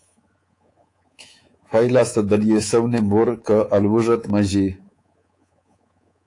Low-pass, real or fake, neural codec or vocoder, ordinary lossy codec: 10.8 kHz; fake; codec, 24 kHz, 3.1 kbps, DualCodec; AAC, 48 kbps